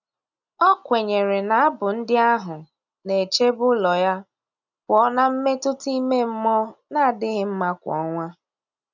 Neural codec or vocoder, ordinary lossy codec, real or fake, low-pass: none; none; real; 7.2 kHz